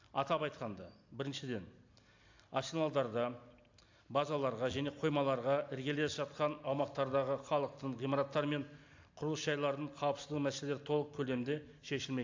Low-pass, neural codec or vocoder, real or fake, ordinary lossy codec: 7.2 kHz; none; real; AAC, 48 kbps